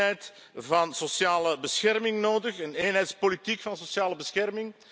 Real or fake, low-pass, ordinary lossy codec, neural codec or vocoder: real; none; none; none